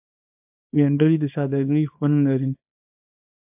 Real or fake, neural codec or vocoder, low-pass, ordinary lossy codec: fake; codec, 24 kHz, 0.9 kbps, WavTokenizer, small release; 3.6 kHz; AAC, 32 kbps